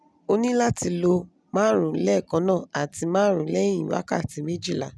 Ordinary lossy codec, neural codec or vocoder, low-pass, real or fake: none; none; none; real